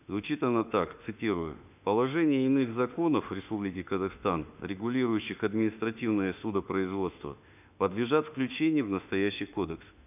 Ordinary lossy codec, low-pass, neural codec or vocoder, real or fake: none; 3.6 kHz; autoencoder, 48 kHz, 32 numbers a frame, DAC-VAE, trained on Japanese speech; fake